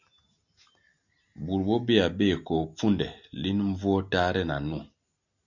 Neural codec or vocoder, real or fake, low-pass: none; real; 7.2 kHz